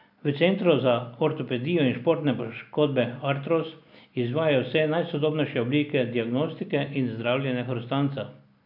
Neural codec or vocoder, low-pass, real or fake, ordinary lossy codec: none; 5.4 kHz; real; none